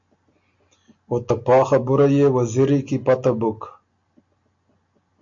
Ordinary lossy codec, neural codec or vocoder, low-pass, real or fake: AAC, 64 kbps; none; 7.2 kHz; real